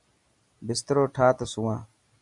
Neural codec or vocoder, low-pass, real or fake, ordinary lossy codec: none; 10.8 kHz; real; MP3, 96 kbps